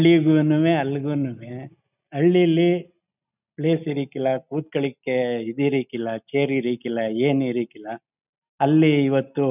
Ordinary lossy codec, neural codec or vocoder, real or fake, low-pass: none; none; real; 3.6 kHz